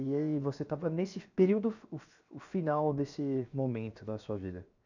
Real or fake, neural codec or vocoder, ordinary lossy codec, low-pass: fake; codec, 16 kHz, 0.7 kbps, FocalCodec; none; 7.2 kHz